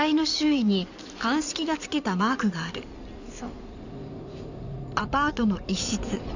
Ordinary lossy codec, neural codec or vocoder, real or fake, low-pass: none; codec, 16 kHz in and 24 kHz out, 2.2 kbps, FireRedTTS-2 codec; fake; 7.2 kHz